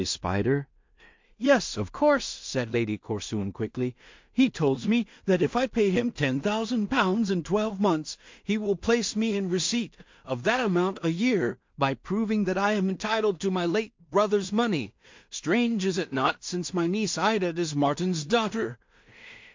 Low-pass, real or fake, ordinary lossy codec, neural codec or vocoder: 7.2 kHz; fake; MP3, 48 kbps; codec, 16 kHz in and 24 kHz out, 0.4 kbps, LongCat-Audio-Codec, two codebook decoder